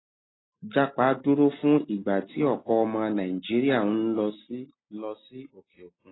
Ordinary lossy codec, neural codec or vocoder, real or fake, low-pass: AAC, 16 kbps; none; real; 7.2 kHz